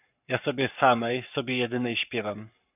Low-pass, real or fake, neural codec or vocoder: 3.6 kHz; fake; codec, 44.1 kHz, 7.8 kbps, Pupu-Codec